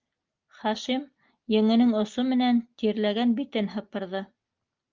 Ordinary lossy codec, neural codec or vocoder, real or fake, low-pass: Opus, 24 kbps; none; real; 7.2 kHz